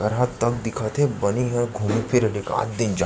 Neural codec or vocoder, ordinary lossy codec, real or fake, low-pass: none; none; real; none